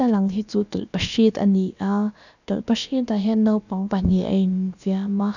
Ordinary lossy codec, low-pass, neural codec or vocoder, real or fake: none; 7.2 kHz; codec, 16 kHz, about 1 kbps, DyCAST, with the encoder's durations; fake